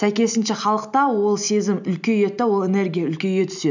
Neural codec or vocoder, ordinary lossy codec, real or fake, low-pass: none; none; real; 7.2 kHz